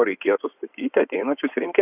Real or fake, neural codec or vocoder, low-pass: fake; codec, 16 kHz in and 24 kHz out, 2.2 kbps, FireRedTTS-2 codec; 3.6 kHz